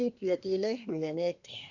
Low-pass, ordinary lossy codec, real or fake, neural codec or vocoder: 7.2 kHz; none; fake; codec, 24 kHz, 1 kbps, SNAC